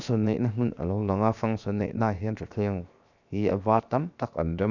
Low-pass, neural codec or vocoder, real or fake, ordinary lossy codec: 7.2 kHz; codec, 16 kHz, 0.7 kbps, FocalCodec; fake; none